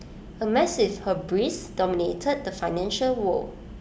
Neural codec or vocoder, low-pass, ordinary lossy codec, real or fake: none; none; none; real